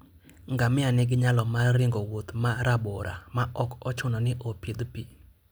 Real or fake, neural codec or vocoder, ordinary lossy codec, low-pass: real; none; none; none